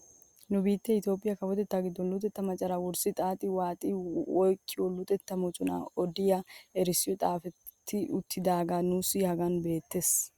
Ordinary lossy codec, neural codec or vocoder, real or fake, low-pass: Opus, 64 kbps; none; real; 19.8 kHz